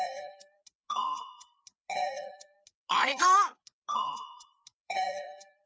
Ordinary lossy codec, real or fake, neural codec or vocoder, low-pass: none; fake; codec, 16 kHz, 4 kbps, FreqCodec, larger model; none